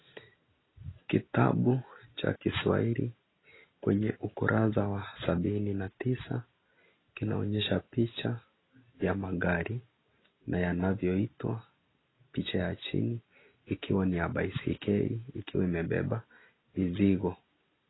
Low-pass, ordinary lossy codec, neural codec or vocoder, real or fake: 7.2 kHz; AAC, 16 kbps; none; real